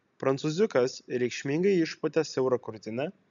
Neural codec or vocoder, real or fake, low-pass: none; real; 7.2 kHz